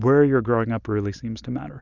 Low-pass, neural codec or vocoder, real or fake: 7.2 kHz; none; real